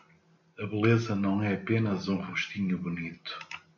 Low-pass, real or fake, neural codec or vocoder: 7.2 kHz; real; none